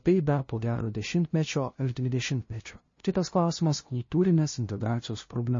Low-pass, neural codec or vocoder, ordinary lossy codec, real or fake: 7.2 kHz; codec, 16 kHz, 0.5 kbps, FunCodec, trained on LibriTTS, 25 frames a second; MP3, 32 kbps; fake